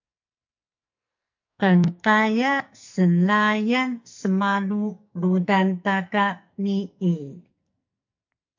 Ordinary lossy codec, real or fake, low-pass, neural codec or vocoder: MP3, 48 kbps; fake; 7.2 kHz; codec, 44.1 kHz, 2.6 kbps, SNAC